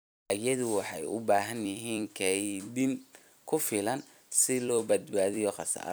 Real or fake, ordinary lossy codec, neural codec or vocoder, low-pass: fake; none; vocoder, 44.1 kHz, 128 mel bands every 256 samples, BigVGAN v2; none